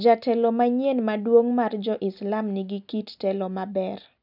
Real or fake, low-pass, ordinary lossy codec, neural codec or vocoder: real; 5.4 kHz; none; none